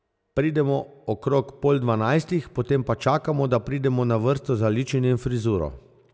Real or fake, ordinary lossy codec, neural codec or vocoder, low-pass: real; none; none; none